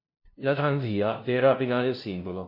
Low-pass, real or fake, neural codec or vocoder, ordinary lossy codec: 5.4 kHz; fake; codec, 16 kHz, 0.5 kbps, FunCodec, trained on LibriTTS, 25 frames a second; MP3, 32 kbps